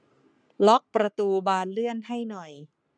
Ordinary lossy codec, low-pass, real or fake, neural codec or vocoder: none; 9.9 kHz; fake; codec, 44.1 kHz, 3.4 kbps, Pupu-Codec